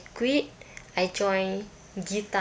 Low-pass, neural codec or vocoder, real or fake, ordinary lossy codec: none; none; real; none